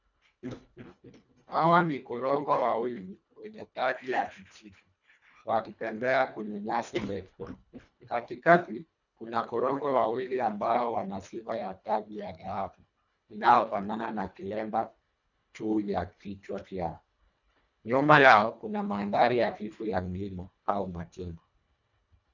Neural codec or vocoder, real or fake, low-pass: codec, 24 kHz, 1.5 kbps, HILCodec; fake; 7.2 kHz